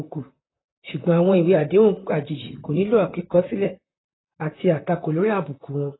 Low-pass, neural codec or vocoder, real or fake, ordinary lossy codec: 7.2 kHz; vocoder, 44.1 kHz, 128 mel bands, Pupu-Vocoder; fake; AAC, 16 kbps